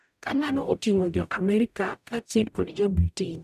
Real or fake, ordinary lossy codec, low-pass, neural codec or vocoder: fake; none; 14.4 kHz; codec, 44.1 kHz, 0.9 kbps, DAC